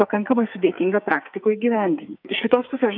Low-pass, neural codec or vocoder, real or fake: 5.4 kHz; codec, 44.1 kHz, 2.6 kbps, SNAC; fake